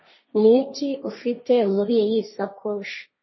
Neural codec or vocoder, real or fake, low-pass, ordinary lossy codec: codec, 16 kHz, 1.1 kbps, Voila-Tokenizer; fake; 7.2 kHz; MP3, 24 kbps